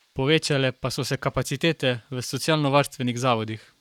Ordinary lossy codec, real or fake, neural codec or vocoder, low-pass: none; fake; codec, 44.1 kHz, 7.8 kbps, Pupu-Codec; 19.8 kHz